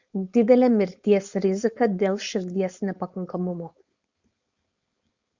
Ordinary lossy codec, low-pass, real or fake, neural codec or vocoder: Opus, 64 kbps; 7.2 kHz; fake; codec, 16 kHz, 4.8 kbps, FACodec